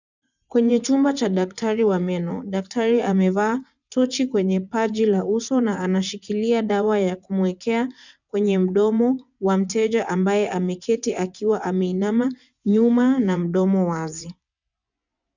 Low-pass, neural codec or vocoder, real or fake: 7.2 kHz; vocoder, 24 kHz, 100 mel bands, Vocos; fake